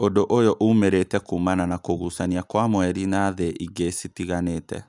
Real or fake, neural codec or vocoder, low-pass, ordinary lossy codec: real; none; 10.8 kHz; none